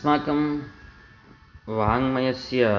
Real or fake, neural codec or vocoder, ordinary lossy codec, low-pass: real; none; none; 7.2 kHz